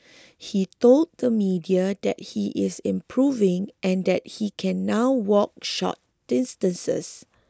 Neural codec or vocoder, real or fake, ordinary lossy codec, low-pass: none; real; none; none